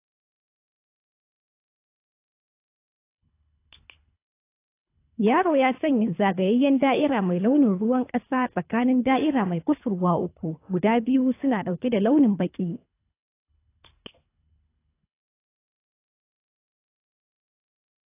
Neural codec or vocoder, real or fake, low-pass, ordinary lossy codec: codec, 24 kHz, 3 kbps, HILCodec; fake; 3.6 kHz; AAC, 24 kbps